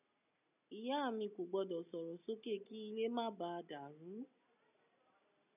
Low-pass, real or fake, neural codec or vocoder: 3.6 kHz; real; none